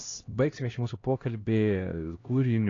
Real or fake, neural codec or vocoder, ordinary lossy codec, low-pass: fake; codec, 16 kHz, 1 kbps, X-Codec, HuBERT features, trained on LibriSpeech; AAC, 32 kbps; 7.2 kHz